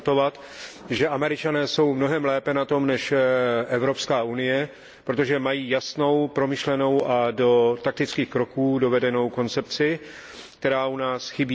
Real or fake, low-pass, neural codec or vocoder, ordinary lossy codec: real; none; none; none